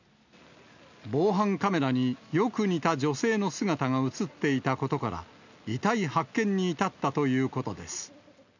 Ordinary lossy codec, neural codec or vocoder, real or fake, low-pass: none; none; real; 7.2 kHz